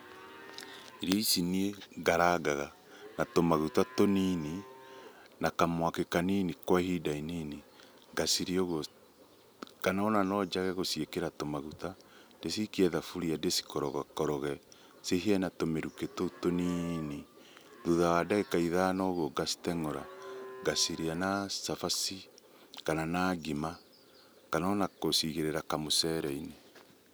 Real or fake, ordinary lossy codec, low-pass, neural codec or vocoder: real; none; none; none